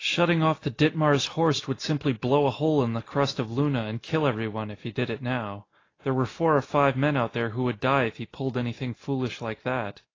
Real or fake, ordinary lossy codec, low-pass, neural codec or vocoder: real; AAC, 32 kbps; 7.2 kHz; none